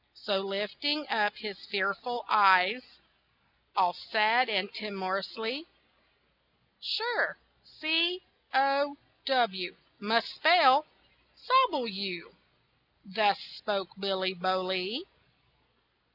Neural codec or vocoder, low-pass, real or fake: none; 5.4 kHz; real